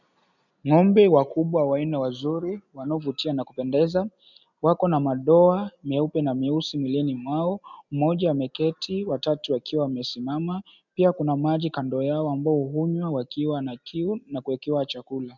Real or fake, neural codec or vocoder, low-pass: real; none; 7.2 kHz